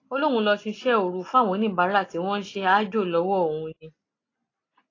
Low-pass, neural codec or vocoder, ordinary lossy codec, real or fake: 7.2 kHz; none; AAC, 32 kbps; real